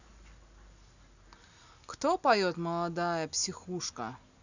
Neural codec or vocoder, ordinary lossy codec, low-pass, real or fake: none; none; 7.2 kHz; real